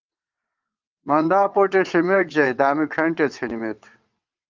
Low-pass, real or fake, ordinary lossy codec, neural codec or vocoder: 7.2 kHz; fake; Opus, 24 kbps; vocoder, 22.05 kHz, 80 mel bands, WaveNeXt